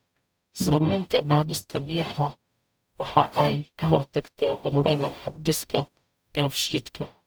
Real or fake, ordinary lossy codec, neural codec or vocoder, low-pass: fake; none; codec, 44.1 kHz, 0.9 kbps, DAC; none